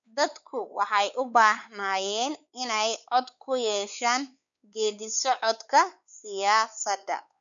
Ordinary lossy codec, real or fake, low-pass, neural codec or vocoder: none; fake; 7.2 kHz; codec, 16 kHz, 4 kbps, X-Codec, WavLM features, trained on Multilingual LibriSpeech